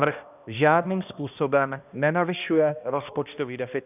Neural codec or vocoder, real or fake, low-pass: codec, 16 kHz, 1 kbps, X-Codec, HuBERT features, trained on balanced general audio; fake; 3.6 kHz